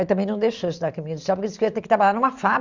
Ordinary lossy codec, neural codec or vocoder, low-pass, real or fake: Opus, 64 kbps; vocoder, 44.1 kHz, 128 mel bands every 512 samples, BigVGAN v2; 7.2 kHz; fake